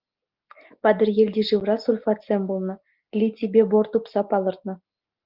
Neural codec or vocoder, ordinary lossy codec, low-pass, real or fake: none; Opus, 32 kbps; 5.4 kHz; real